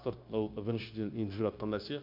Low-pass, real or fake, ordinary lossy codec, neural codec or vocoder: 5.4 kHz; fake; MP3, 48 kbps; codec, 16 kHz, 0.9 kbps, LongCat-Audio-Codec